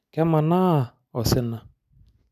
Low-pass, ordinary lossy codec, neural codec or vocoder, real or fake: 14.4 kHz; none; none; real